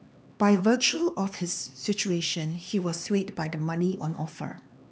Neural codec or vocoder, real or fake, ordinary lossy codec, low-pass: codec, 16 kHz, 2 kbps, X-Codec, HuBERT features, trained on LibriSpeech; fake; none; none